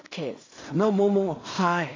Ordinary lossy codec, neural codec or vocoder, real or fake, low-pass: AAC, 32 kbps; codec, 16 kHz in and 24 kHz out, 0.4 kbps, LongCat-Audio-Codec, two codebook decoder; fake; 7.2 kHz